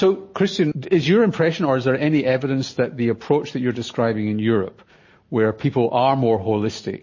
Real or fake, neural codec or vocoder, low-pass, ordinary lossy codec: real; none; 7.2 kHz; MP3, 32 kbps